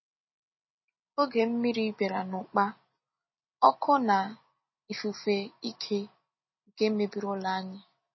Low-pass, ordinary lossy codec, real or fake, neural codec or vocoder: 7.2 kHz; MP3, 24 kbps; real; none